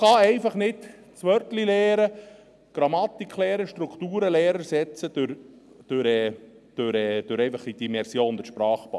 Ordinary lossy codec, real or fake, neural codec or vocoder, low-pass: none; real; none; none